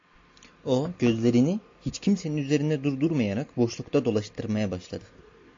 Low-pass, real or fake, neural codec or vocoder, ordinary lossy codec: 7.2 kHz; real; none; AAC, 48 kbps